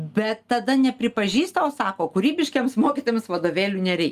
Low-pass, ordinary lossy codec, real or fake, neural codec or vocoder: 14.4 kHz; Opus, 32 kbps; real; none